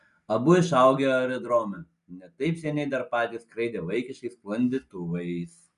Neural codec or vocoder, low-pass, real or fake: none; 10.8 kHz; real